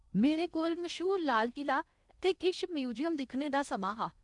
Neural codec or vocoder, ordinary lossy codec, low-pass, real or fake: codec, 16 kHz in and 24 kHz out, 0.8 kbps, FocalCodec, streaming, 65536 codes; none; 10.8 kHz; fake